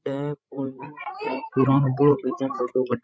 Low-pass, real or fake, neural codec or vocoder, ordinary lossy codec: none; fake; codec, 16 kHz, 16 kbps, FreqCodec, larger model; none